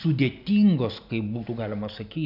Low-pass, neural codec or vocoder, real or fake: 5.4 kHz; none; real